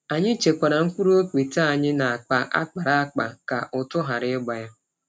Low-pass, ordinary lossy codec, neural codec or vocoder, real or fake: none; none; none; real